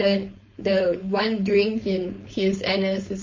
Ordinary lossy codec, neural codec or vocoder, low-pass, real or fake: MP3, 32 kbps; codec, 16 kHz, 4.8 kbps, FACodec; 7.2 kHz; fake